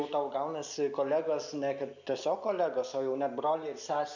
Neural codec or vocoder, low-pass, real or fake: none; 7.2 kHz; real